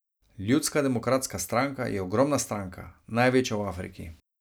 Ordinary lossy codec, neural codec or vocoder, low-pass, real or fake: none; none; none; real